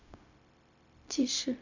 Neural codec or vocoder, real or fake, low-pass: codec, 16 kHz, 0.4 kbps, LongCat-Audio-Codec; fake; 7.2 kHz